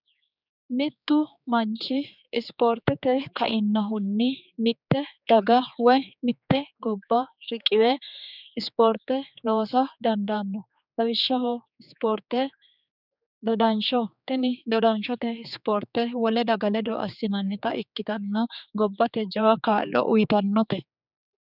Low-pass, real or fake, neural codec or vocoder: 5.4 kHz; fake; codec, 16 kHz, 4 kbps, X-Codec, HuBERT features, trained on general audio